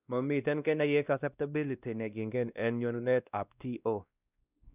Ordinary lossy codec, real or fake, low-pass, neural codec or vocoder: none; fake; 3.6 kHz; codec, 16 kHz, 1 kbps, X-Codec, WavLM features, trained on Multilingual LibriSpeech